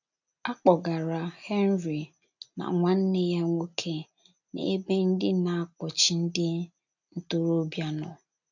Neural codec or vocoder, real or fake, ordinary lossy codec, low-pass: none; real; none; 7.2 kHz